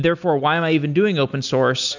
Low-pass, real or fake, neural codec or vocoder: 7.2 kHz; real; none